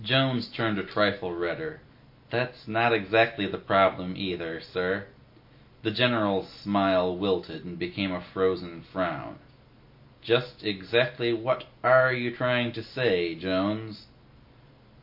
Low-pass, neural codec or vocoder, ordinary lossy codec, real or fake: 5.4 kHz; none; MP3, 32 kbps; real